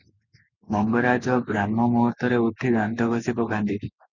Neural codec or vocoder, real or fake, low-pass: none; real; 7.2 kHz